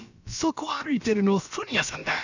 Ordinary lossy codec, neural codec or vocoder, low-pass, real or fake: none; codec, 16 kHz, about 1 kbps, DyCAST, with the encoder's durations; 7.2 kHz; fake